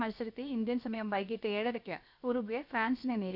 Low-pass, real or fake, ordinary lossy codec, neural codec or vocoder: 5.4 kHz; fake; none; codec, 16 kHz, about 1 kbps, DyCAST, with the encoder's durations